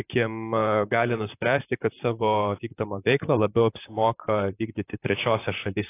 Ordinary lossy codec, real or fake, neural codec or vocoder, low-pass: AAC, 24 kbps; fake; vocoder, 44.1 kHz, 128 mel bands, Pupu-Vocoder; 3.6 kHz